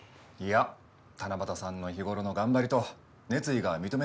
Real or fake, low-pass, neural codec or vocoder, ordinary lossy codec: real; none; none; none